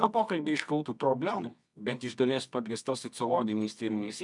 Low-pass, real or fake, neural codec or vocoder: 10.8 kHz; fake; codec, 24 kHz, 0.9 kbps, WavTokenizer, medium music audio release